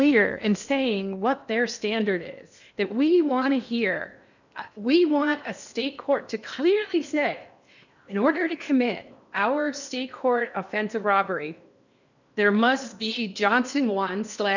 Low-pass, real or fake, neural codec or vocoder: 7.2 kHz; fake; codec, 16 kHz in and 24 kHz out, 0.8 kbps, FocalCodec, streaming, 65536 codes